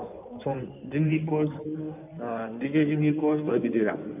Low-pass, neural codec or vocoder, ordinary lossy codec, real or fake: 3.6 kHz; codec, 16 kHz in and 24 kHz out, 2.2 kbps, FireRedTTS-2 codec; none; fake